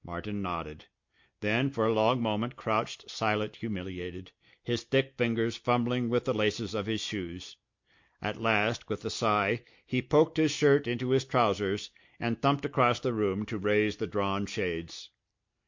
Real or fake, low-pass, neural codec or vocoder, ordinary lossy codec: real; 7.2 kHz; none; MP3, 48 kbps